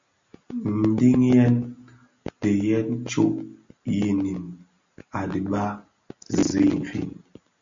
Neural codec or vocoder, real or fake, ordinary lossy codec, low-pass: none; real; MP3, 64 kbps; 7.2 kHz